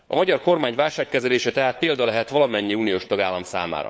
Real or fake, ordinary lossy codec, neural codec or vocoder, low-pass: fake; none; codec, 16 kHz, 4 kbps, FunCodec, trained on LibriTTS, 50 frames a second; none